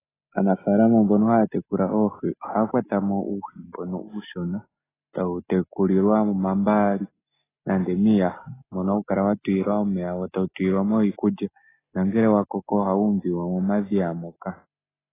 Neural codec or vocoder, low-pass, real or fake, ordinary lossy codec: none; 3.6 kHz; real; AAC, 16 kbps